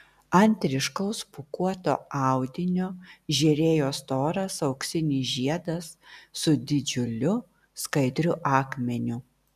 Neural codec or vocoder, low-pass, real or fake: none; 14.4 kHz; real